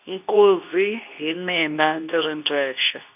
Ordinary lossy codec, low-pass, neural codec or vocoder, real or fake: none; 3.6 kHz; codec, 24 kHz, 0.9 kbps, WavTokenizer, medium speech release version 2; fake